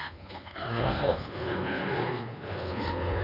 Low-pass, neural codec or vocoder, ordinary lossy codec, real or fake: 5.4 kHz; codec, 24 kHz, 1.2 kbps, DualCodec; none; fake